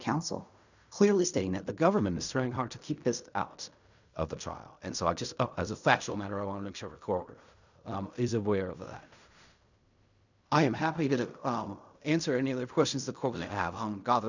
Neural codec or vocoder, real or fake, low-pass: codec, 16 kHz in and 24 kHz out, 0.4 kbps, LongCat-Audio-Codec, fine tuned four codebook decoder; fake; 7.2 kHz